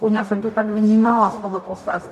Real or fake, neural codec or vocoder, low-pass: fake; codec, 44.1 kHz, 0.9 kbps, DAC; 14.4 kHz